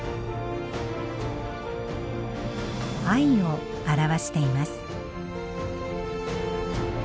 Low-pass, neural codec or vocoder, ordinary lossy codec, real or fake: none; none; none; real